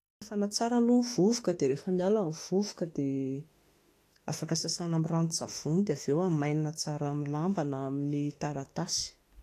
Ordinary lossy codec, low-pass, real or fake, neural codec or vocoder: AAC, 48 kbps; 14.4 kHz; fake; autoencoder, 48 kHz, 32 numbers a frame, DAC-VAE, trained on Japanese speech